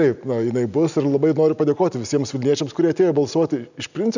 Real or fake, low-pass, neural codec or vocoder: real; 7.2 kHz; none